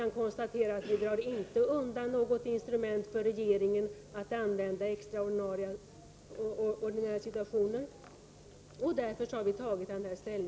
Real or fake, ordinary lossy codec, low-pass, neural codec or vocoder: real; none; none; none